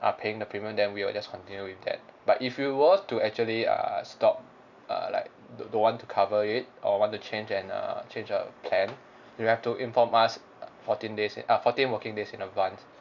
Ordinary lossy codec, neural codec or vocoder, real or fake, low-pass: none; none; real; 7.2 kHz